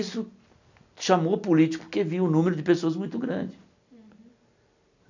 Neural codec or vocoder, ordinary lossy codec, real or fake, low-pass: none; none; real; 7.2 kHz